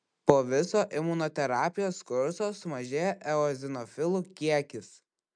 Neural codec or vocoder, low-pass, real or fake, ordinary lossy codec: none; 9.9 kHz; real; MP3, 96 kbps